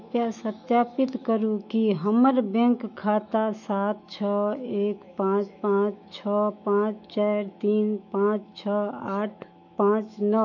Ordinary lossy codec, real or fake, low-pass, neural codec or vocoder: none; fake; 7.2 kHz; autoencoder, 48 kHz, 128 numbers a frame, DAC-VAE, trained on Japanese speech